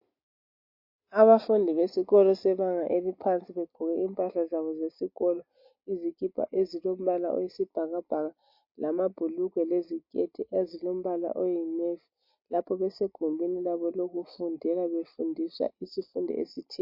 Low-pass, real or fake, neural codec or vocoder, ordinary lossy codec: 5.4 kHz; real; none; MP3, 32 kbps